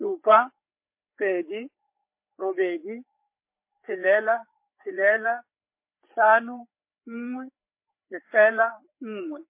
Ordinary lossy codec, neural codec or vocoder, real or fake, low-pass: MP3, 24 kbps; codec, 16 kHz, 4 kbps, FreqCodec, larger model; fake; 3.6 kHz